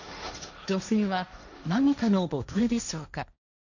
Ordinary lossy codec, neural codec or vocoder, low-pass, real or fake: none; codec, 16 kHz, 1.1 kbps, Voila-Tokenizer; 7.2 kHz; fake